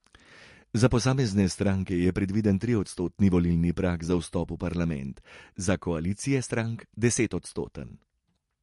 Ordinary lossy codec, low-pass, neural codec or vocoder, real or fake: MP3, 48 kbps; 14.4 kHz; none; real